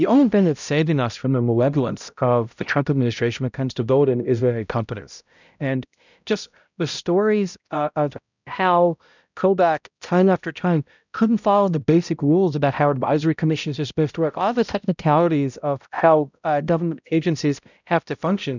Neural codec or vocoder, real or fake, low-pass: codec, 16 kHz, 0.5 kbps, X-Codec, HuBERT features, trained on balanced general audio; fake; 7.2 kHz